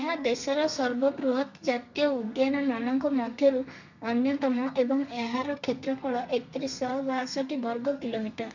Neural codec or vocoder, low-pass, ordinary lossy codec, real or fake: codec, 32 kHz, 1.9 kbps, SNAC; 7.2 kHz; none; fake